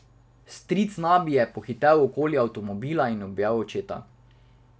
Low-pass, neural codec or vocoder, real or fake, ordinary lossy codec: none; none; real; none